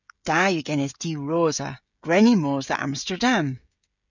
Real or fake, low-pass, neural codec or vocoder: fake; 7.2 kHz; codec, 16 kHz, 16 kbps, FreqCodec, smaller model